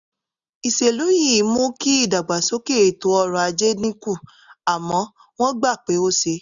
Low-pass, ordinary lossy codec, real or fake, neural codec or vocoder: 7.2 kHz; none; real; none